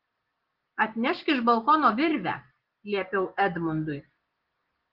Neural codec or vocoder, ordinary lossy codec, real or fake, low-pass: none; Opus, 16 kbps; real; 5.4 kHz